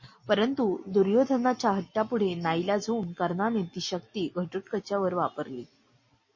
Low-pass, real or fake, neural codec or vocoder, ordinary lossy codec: 7.2 kHz; real; none; MP3, 32 kbps